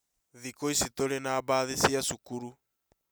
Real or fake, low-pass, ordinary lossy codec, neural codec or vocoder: real; none; none; none